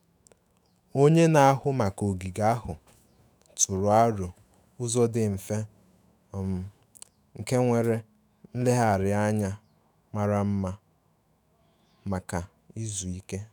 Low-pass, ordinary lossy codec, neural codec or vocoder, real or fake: none; none; autoencoder, 48 kHz, 128 numbers a frame, DAC-VAE, trained on Japanese speech; fake